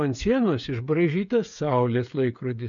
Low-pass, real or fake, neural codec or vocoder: 7.2 kHz; fake; codec, 16 kHz, 8 kbps, FreqCodec, smaller model